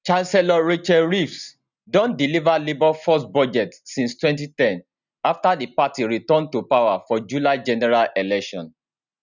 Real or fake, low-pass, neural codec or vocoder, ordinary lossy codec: real; 7.2 kHz; none; none